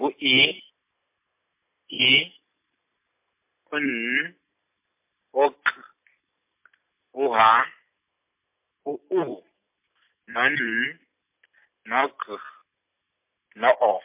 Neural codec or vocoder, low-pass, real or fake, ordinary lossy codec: none; 3.6 kHz; real; MP3, 32 kbps